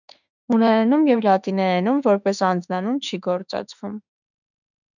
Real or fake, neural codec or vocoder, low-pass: fake; autoencoder, 48 kHz, 32 numbers a frame, DAC-VAE, trained on Japanese speech; 7.2 kHz